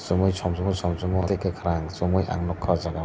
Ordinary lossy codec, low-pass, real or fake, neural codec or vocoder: none; none; real; none